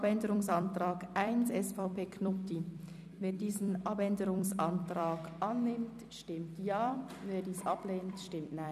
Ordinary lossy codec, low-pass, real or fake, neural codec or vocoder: none; 14.4 kHz; fake; vocoder, 44.1 kHz, 128 mel bands every 256 samples, BigVGAN v2